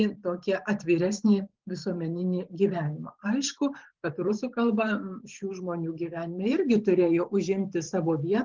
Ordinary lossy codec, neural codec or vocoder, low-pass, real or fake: Opus, 16 kbps; vocoder, 44.1 kHz, 128 mel bands every 512 samples, BigVGAN v2; 7.2 kHz; fake